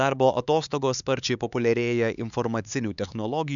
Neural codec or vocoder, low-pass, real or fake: codec, 16 kHz, 4 kbps, X-Codec, HuBERT features, trained on LibriSpeech; 7.2 kHz; fake